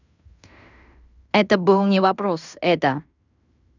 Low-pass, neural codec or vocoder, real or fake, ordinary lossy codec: 7.2 kHz; codec, 16 kHz in and 24 kHz out, 0.9 kbps, LongCat-Audio-Codec, fine tuned four codebook decoder; fake; none